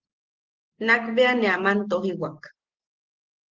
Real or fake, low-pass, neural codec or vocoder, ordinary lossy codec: real; 7.2 kHz; none; Opus, 16 kbps